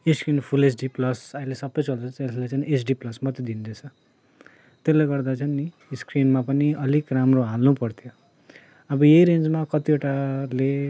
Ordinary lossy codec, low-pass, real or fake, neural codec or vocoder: none; none; real; none